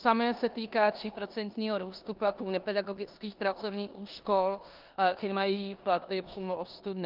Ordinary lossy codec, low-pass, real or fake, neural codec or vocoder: Opus, 32 kbps; 5.4 kHz; fake; codec, 16 kHz in and 24 kHz out, 0.9 kbps, LongCat-Audio-Codec, four codebook decoder